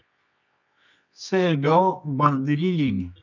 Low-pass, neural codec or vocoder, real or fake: 7.2 kHz; codec, 24 kHz, 0.9 kbps, WavTokenizer, medium music audio release; fake